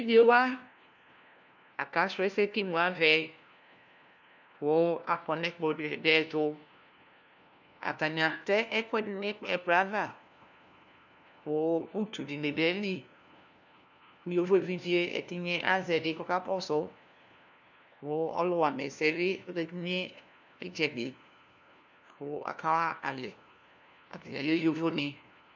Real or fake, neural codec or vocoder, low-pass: fake; codec, 16 kHz, 1 kbps, FunCodec, trained on LibriTTS, 50 frames a second; 7.2 kHz